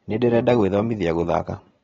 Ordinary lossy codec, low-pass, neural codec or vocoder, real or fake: AAC, 24 kbps; 7.2 kHz; none; real